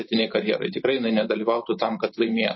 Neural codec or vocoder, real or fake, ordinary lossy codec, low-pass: vocoder, 44.1 kHz, 128 mel bands every 512 samples, BigVGAN v2; fake; MP3, 24 kbps; 7.2 kHz